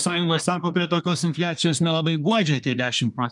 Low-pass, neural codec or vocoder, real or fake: 10.8 kHz; codec, 24 kHz, 1 kbps, SNAC; fake